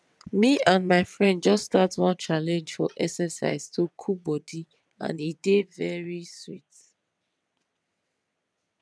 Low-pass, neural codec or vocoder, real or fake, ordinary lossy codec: none; vocoder, 22.05 kHz, 80 mel bands, WaveNeXt; fake; none